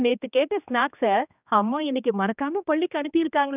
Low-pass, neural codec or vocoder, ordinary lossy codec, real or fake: 3.6 kHz; codec, 16 kHz, 2 kbps, X-Codec, HuBERT features, trained on general audio; none; fake